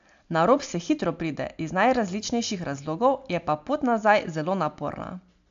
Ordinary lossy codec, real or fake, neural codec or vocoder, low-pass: AAC, 64 kbps; real; none; 7.2 kHz